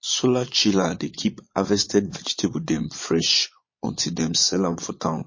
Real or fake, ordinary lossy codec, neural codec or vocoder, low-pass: fake; MP3, 32 kbps; vocoder, 22.05 kHz, 80 mel bands, Vocos; 7.2 kHz